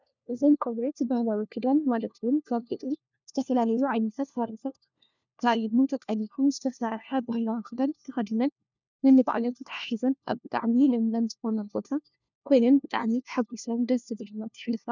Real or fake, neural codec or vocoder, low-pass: fake; codec, 16 kHz, 1 kbps, FunCodec, trained on LibriTTS, 50 frames a second; 7.2 kHz